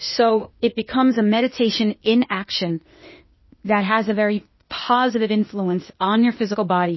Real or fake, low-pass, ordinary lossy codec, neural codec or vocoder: fake; 7.2 kHz; MP3, 24 kbps; codec, 16 kHz, 0.8 kbps, ZipCodec